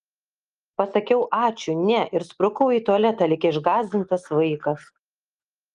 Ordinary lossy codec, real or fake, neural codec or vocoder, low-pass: Opus, 24 kbps; real; none; 9.9 kHz